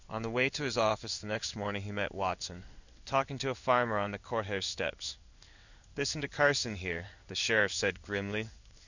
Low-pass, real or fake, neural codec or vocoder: 7.2 kHz; fake; codec, 16 kHz in and 24 kHz out, 1 kbps, XY-Tokenizer